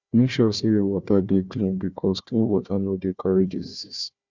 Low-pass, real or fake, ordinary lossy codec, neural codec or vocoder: 7.2 kHz; fake; Opus, 64 kbps; codec, 16 kHz, 1 kbps, FunCodec, trained on Chinese and English, 50 frames a second